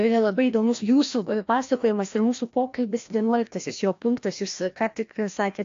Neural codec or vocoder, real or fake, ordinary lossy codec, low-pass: codec, 16 kHz, 1 kbps, FreqCodec, larger model; fake; AAC, 48 kbps; 7.2 kHz